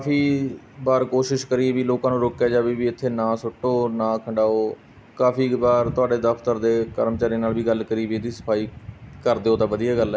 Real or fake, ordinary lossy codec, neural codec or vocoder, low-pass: real; none; none; none